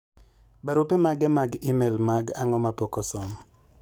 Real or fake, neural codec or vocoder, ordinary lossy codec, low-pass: fake; codec, 44.1 kHz, 7.8 kbps, DAC; none; none